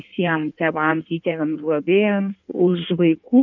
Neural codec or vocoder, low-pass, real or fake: codec, 16 kHz in and 24 kHz out, 1.1 kbps, FireRedTTS-2 codec; 7.2 kHz; fake